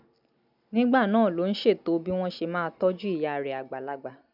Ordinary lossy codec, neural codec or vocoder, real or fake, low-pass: none; none; real; 5.4 kHz